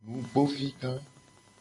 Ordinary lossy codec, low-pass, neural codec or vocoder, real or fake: AAC, 48 kbps; 10.8 kHz; none; real